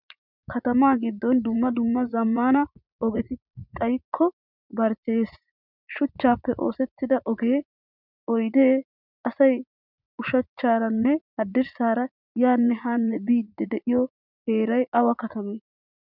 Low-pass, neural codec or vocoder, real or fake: 5.4 kHz; vocoder, 44.1 kHz, 80 mel bands, Vocos; fake